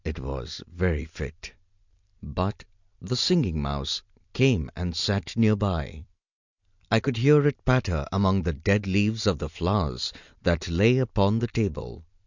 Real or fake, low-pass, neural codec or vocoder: real; 7.2 kHz; none